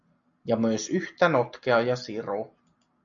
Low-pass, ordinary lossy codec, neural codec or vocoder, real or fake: 7.2 kHz; MP3, 96 kbps; none; real